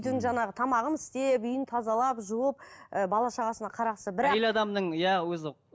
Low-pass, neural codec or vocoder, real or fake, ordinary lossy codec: none; none; real; none